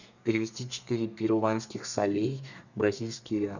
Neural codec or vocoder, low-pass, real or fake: codec, 32 kHz, 1.9 kbps, SNAC; 7.2 kHz; fake